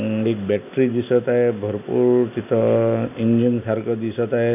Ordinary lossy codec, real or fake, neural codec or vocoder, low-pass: none; real; none; 3.6 kHz